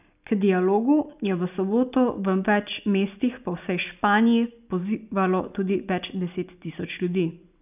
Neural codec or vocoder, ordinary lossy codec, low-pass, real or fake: none; none; 3.6 kHz; real